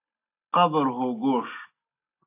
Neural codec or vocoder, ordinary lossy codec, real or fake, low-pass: none; AAC, 24 kbps; real; 3.6 kHz